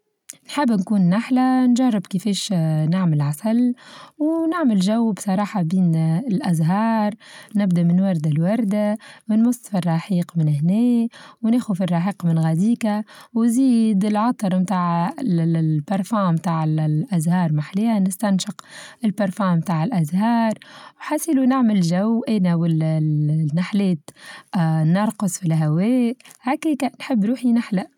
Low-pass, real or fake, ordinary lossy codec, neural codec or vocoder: 19.8 kHz; real; none; none